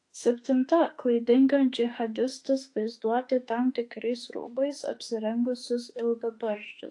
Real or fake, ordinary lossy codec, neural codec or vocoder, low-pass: fake; AAC, 48 kbps; autoencoder, 48 kHz, 32 numbers a frame, DAC-VAE, trained on Japanese speech; 10.8 kHz